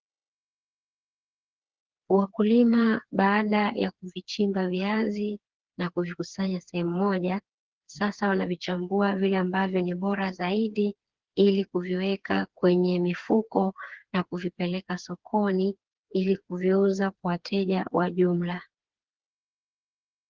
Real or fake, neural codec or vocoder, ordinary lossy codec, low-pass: fake; codec, 16 kHz, 4 kbps, FreqCodec, smaller model; Opus, 16 kbps; 7.2 kHz